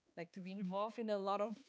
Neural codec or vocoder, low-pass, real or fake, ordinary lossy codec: codec, 16 kHz, 2 kbps, X-Codec, HuBERT features, trained on balanced general audio; none; fake; none